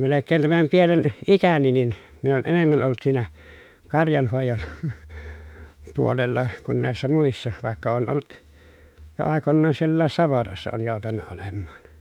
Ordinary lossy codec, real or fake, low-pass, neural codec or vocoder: none; fake; 19.8 kHz; autoencoder, 48 kHz, 32 numbers a frame, DAC-VAE, trained on Japanese speech